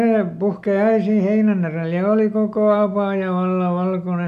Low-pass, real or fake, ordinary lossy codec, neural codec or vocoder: 14.4 kHz; real; none; none